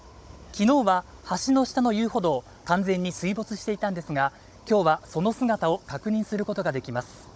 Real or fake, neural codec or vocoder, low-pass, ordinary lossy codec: fake; codec, 16 kHz, 16 kbps, FunCodec, trained on Chinese and English, 50 frames a second; none; none